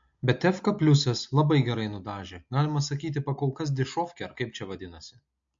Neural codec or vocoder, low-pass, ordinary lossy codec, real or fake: none; 7.2 kHz; MP3, 48 kbps; real